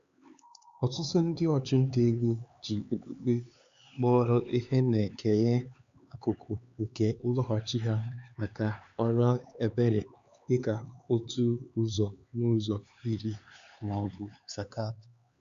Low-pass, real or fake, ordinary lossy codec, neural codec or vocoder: 7.2 kHz; fake; Opus, 64 kbps; codec, 16 kHz, 4 kbps, X-Codec, HuBERT features, trained on LibriSpeech